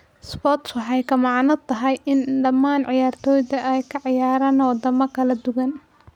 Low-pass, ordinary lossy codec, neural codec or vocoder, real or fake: 19.8 kHz; none; none; real